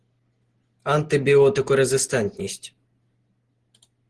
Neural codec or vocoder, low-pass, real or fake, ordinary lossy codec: none; 10.8 kHz; real; Opus, 16 kbps